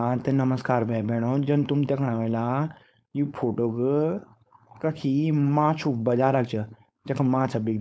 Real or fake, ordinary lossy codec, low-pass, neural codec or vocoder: fake; none; none; codec, 16 kHz, 4.8 kbps, FACodec